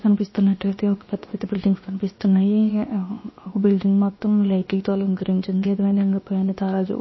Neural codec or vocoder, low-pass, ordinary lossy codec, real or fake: codec, 16 kHz, 0.7 kbps, FocalCodec; 7.2 kHz; MP3, 24 kbps; fake